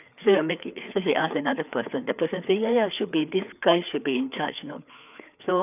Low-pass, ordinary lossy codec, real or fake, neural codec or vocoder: 3.6 kHz; none; fake; codec, 16 kHz, 4 kbps, FreqCodec, larger model